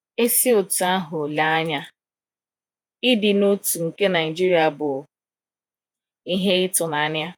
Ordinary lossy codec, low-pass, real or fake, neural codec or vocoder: none; none; fake; vocoder, 48 kHz, 128 mel bands, Vocos